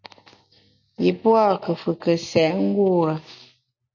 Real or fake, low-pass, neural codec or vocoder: real; 7.2 kHz; none